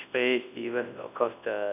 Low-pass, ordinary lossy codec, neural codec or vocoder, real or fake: 3.6 kHz; none; codec, 24 kHz, 0.9 kbps, WavTokenizer, large speech release; fake